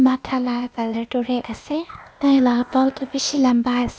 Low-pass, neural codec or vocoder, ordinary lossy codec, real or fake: none; codec, 16 kHz, 0.8 kbps, ZipCodec; none; fake